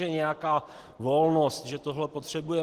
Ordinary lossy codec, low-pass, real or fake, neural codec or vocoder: Opus, 16 kbps; 14.4 kHz; real; none